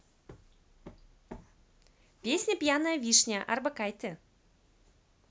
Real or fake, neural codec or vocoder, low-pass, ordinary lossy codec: real; none; none; none